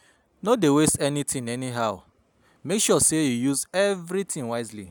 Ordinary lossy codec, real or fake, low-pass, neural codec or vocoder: none; real; none; none